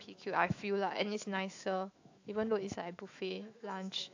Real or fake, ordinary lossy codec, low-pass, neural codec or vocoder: fake; AAC, 48 kbps; 7.2 kHz; autoencoder, 48 kHz, 128 numbers a frame, DAC-VAE, trained on Japanese speech